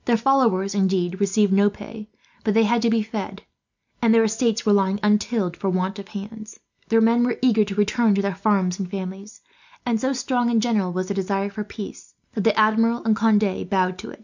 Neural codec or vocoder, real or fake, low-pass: none; real; 7.2 kHz